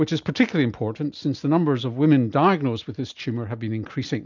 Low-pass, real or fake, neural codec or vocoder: 7.2 kHz; real; none